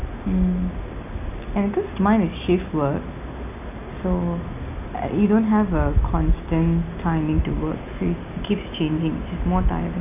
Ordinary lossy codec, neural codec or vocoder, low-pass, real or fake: none; none; 3.6 kHz; real